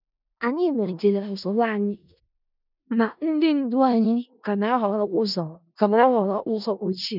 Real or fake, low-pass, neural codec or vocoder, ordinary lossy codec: fake; 5.4 kHz; codec, 16 kHz in and 24 kHz out, 0.4 kbps, LongCat-Audio-Codec, four codebook decoder; none